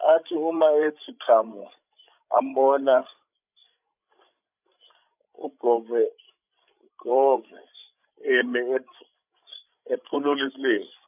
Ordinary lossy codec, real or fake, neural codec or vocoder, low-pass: none; fake; codec, 16 kHz, 16 kbps, FreqCodec, larger model; 3.6 kHz